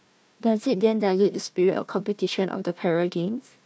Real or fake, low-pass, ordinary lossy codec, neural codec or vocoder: fake; none; none; codec, 16 kHz, 1 kbps, FunCodec, trained on Chinese and English, 50 frames a second